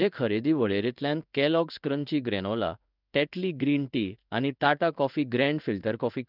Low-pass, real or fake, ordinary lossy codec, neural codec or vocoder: 5.4 kHz; fake; none; codec, 16 kHz in and 24 kHz out, 1 kbps, XY-Tokenizer